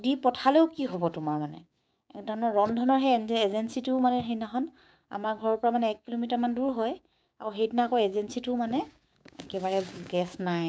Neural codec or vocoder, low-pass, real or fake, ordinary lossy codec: codec, 16 kHz, 6 kbps, DAC; none; fake; none